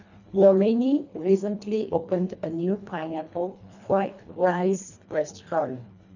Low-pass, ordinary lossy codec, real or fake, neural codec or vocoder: 7.2 kHz; none; fake; codec, 24 kHz, 1.5 kbps, HILCodec